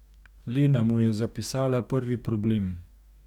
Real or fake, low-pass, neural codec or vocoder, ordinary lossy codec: fake; 19.8 kHz; codec, 44.1 kHz, 2.6 kbps, DAC; none